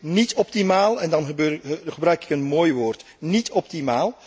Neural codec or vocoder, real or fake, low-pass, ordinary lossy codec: none; real; none; none